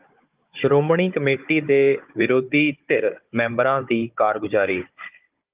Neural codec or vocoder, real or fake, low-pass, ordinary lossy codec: codec, 16 kHz, 16 kbps, FunCodec, trained on Chinese and English, 50 frames a second; fake; 3.6 kHz; Opus, 32 kbps